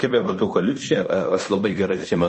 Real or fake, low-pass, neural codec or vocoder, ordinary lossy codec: fake; 10.8 kHz; codec, 16 kHz in and 24 kHz out, 0.9 kbps, LongCat-Audio-Codec, fine tuned four codebook decoder; MP3, 32 kbps